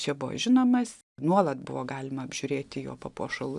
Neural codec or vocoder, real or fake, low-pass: none; real; 10.8 kHz